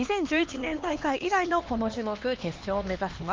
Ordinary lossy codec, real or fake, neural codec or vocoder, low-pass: Opus, 24 kbps; fake; codec, 16 kHz, 2 kbps, X-Codec, HuBERT features, trained on LibriSpeech; 7.2 kHz